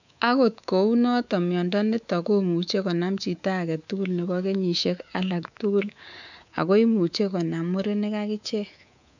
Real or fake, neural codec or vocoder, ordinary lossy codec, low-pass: fake; codec, 24 kHz, 3.1 kbps, DualCodec; none; 7.2 kHz